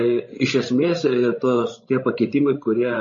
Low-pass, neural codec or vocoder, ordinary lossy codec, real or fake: 7.2 kHz; codec, 16 kHz, 16 kbps, FreqCodec, larger model; MP3, 32 kbps; fake